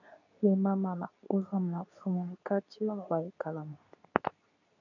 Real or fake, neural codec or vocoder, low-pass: fake; codec, 24 kHz, 0.9 kbps, WavTokenizer, medium speech release version 2; 7.2 kHz